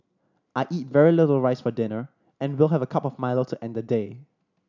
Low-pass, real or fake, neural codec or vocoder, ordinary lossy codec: 7.2 kHz; real; none; none